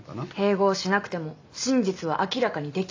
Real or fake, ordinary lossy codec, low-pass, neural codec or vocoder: real; AAC, 48 kbps; 7.2 kHz; none